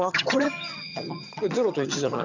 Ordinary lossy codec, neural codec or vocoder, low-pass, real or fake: none; vocoder, 22.05 kHz, 80 mel bands, HiFi-GAN; 7.2 kHz; fake